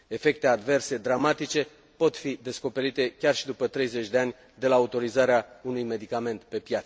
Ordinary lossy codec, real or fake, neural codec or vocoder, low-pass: none; real; none; none